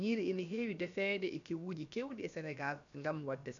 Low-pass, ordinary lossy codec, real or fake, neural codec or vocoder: 7.2 kHz; none; fake; codec, 16 kHz, about 1 kbps, DyCAST, with the encoder's durations